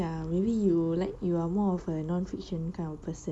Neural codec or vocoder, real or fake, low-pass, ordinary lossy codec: none; real; none; none